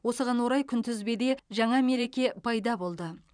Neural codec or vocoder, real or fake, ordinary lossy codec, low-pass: vocoder, 44.1 kHz, 128 mel bands every 512 samples, BigVGAN v2; fake; none; 9.9 kHz